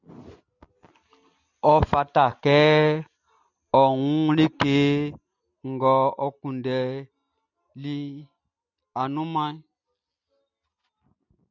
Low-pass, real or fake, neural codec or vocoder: 7.2 kHz; real; none